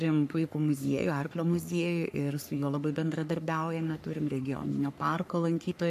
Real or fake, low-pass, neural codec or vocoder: fake; 14.4 kHz; codec, 44.1 kHz, 3.4 kbps, Pupu-Codec